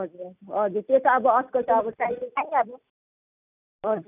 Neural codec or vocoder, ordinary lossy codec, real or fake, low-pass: none; none; real; 3.6 kHz